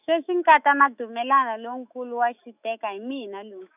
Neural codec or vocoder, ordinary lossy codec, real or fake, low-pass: autoencoder, 48 kHz, 128 numbers a frame, DAC-VAE, trained on Japanese speech; none; fake; 3.6 kHz